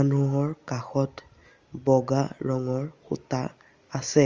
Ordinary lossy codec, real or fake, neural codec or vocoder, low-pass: Opus, 32 kbps; real; none; 7.2 kHz